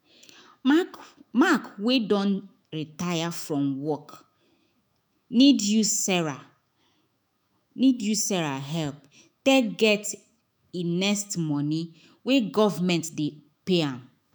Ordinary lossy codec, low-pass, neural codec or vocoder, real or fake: none; none; autoencoder, 48 kHz, 128 numbers a frame, DAC-VAE, trained on Japanese speech; fake